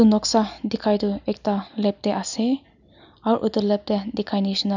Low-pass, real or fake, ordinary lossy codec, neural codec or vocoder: 7.2 kHz; real; none; none